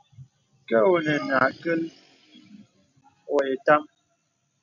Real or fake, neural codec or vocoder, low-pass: real; none; 7.2 kHz